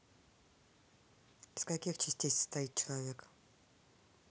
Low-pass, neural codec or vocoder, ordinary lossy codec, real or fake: none; none; none; real